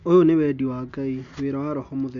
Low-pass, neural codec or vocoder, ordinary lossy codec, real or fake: 7.2 kHz; none; AAC, 64 kbps; real